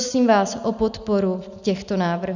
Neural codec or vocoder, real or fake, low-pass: none; real; 7.2 kHz